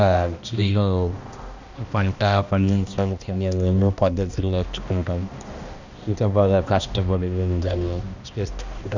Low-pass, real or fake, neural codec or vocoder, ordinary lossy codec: 7.2 kHz; fake; codec, 16 kHz, 1 kbps, X-Codec, HuBERT features, trained on balanced general audio; none